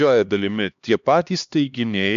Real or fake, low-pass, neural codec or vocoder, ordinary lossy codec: fake; 7.2 kHz; codec, 16 kHz, 2 kbps, X-Codec, HuBERT features, trained on LibriSpeech; MP3, 64 kbps